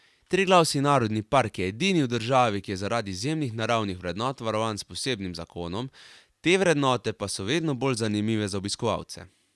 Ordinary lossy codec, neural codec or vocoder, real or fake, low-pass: none; none; real; none